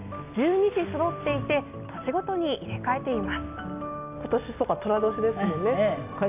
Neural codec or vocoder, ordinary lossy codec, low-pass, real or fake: none; none; 3.6 kHz; real